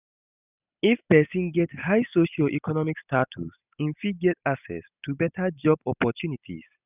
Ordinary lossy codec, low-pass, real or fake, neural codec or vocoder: none; 3.6 kHz; real; none